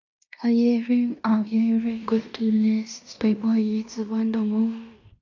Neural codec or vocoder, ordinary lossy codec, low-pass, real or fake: codec, 16 kHz in and 24 kHz out, 0.9 kbps, LongCat-Audio-Codec, fine tuned four codebook decoder; AAC, 48 kbps; 7.2 kHz; fake